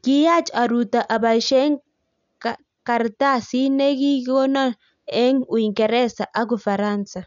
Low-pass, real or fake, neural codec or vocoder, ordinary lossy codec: 7.2 kHz; real; none; none